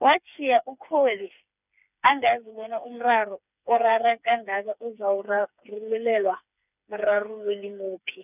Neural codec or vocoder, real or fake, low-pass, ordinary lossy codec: codec, 16 kHz, 4 kbps, FreqCodec, smaller model; fake; 3.6 kHz; none